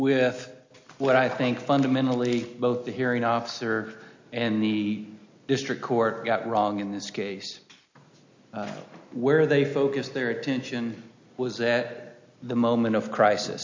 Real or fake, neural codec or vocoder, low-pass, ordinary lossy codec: real; none; 7.2 kHz; MP3, 48 kbps